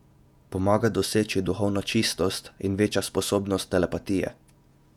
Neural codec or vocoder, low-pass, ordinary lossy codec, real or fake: vocoder, 48 kHz, 128 mel bands, Vocos; 19.8 kHz; none; fake